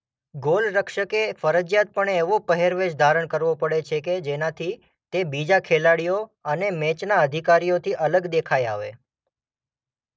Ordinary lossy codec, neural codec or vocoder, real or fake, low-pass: none; none; real; none